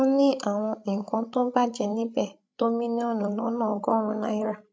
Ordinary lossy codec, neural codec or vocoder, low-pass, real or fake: none; codec, 16 kHz, 16 kbps, FreqCodec, larger model; none; fake